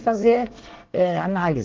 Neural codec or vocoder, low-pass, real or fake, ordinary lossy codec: codec, 24 kHz, 1 kbps, SNAC; 7.2 kHz; fake; Opus, 16 kbps